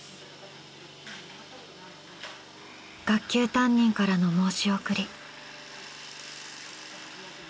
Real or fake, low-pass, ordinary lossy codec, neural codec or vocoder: real; none; none; none